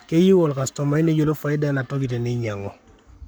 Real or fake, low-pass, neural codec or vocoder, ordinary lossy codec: fake; none; codec, 44.1 kHz, 7.8 kbps, Pupu-Codec; none